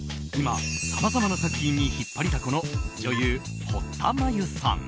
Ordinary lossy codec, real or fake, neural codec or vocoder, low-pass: none; real; none; none